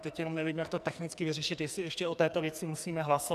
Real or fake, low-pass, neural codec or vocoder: fake; 14.4 kHz; codec, 44.1 kHz, 2.6 kbps, SNAC